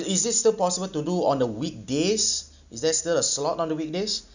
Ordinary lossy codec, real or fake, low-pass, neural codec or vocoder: none; real; 7.2 kHz; none